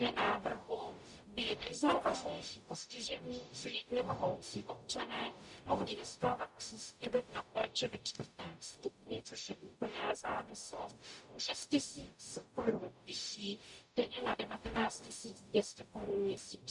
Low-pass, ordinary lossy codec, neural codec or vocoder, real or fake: 10.8 kHz; MP3, 64 kbps; codec, 44.1 kHz, 0.9 kbps, DAC; fake